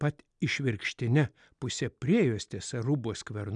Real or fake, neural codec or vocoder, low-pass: real; none; 9.9 kHz